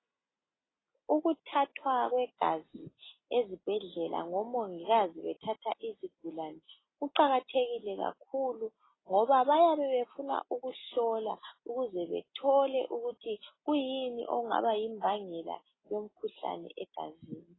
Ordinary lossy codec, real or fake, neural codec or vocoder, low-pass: AAC, 16 kbps; real; none; 7.2 kHz